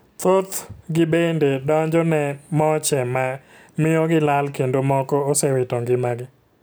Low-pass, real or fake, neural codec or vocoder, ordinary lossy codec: none; real; none; none